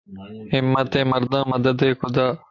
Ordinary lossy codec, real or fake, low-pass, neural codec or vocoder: AAC, 48 kbps; real; 7.2 kHz; none